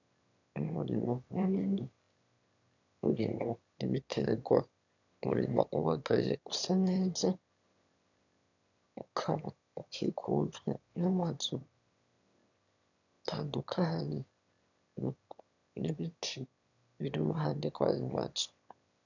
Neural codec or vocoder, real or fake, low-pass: autoencoder, 22.05 kHz, a latent of 192 numbers a frame, VITS, trained on one speaker; fake; 7.2 kHz